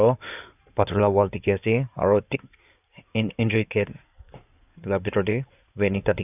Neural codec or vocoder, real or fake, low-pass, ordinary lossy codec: codec, 16 kHz in and 24 kHz out, 2.2 kbps, FireRedTTS-2 codec; fake; 3.6 kHz; none